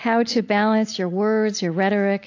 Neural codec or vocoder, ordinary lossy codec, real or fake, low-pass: none; AAC, 48 kbps; real; 7.2 kHz